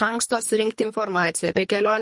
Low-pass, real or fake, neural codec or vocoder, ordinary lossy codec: 10.8 kHz; fake; codec, 24 kHz, 3 kbps, HILCodec; MP3, 48 kbps